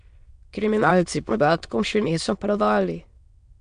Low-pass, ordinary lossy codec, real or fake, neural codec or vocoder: 9.9 kHz; MP3, 64 kbps; fake; autoencoder, 22.05 kHz, a latent of 192 numbers a frame, VITS, trained on many speakers